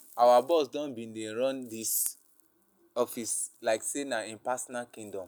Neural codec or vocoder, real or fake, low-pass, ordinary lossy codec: none; real; none; none